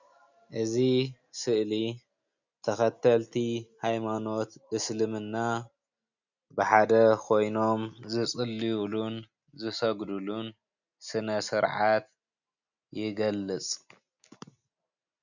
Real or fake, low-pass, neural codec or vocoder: real; 7.2 kHz; none